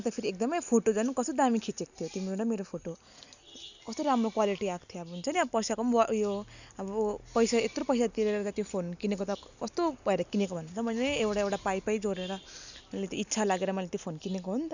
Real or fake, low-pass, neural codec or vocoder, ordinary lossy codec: real; 7.2 kHz; none; none